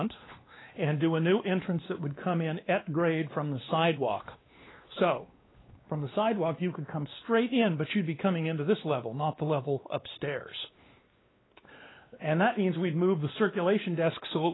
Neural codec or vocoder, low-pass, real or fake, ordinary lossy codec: codec, 16 kHz, 2 kbps, X-Codec, WavLM features, trained on Multilingual LibriSpeech; 7.2 kHz; fake; AAC, 16 kbps